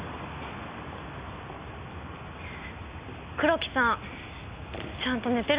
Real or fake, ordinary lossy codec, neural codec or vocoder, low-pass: real; Opus, 64 kbps; none; 3.6 kHz